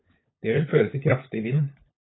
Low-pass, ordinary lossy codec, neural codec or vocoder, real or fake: 7.2 kHz; AAC, 16 kbps; codec, 16 kHz, 16 kbps, FunCodec, trained on LibriTTS, 50 frames a second; fake